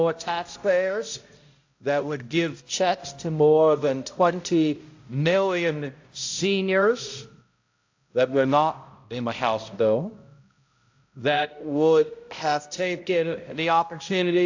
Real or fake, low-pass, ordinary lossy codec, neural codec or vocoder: fake; 7.2 kHz; AAC, 48 kbps; codec, 16 kHz, 0.5 kbps, X-Codec, HuBERT features, trained on balanced general audio